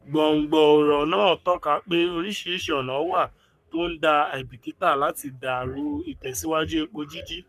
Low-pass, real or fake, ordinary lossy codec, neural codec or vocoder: 14.4 kHz; fake; none; codec, 44.1 kHz, 3.4 kbps, Pupu-Codec